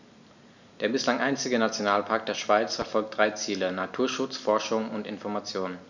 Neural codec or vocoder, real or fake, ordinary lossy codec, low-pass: none; real; none; 7.2 kHz